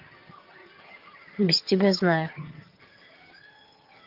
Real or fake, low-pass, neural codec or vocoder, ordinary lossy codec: fake; 5.4 kHz; vocoder, 22.05 kHz, 80 mel bands, HiFi-GAN; Opus, 32 kbps